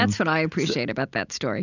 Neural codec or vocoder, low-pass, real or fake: none; 7.2 kHz; real